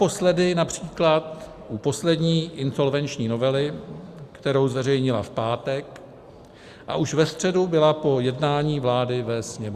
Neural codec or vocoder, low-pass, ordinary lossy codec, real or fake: none; 14.4 kHz; Opus, 64 kbps; real